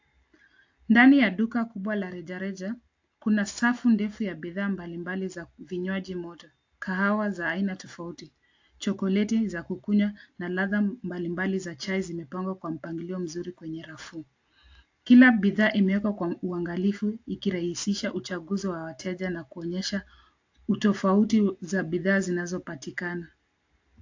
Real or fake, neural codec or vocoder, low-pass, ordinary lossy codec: real; none; 7.2 kHz; AAC, 48 kbps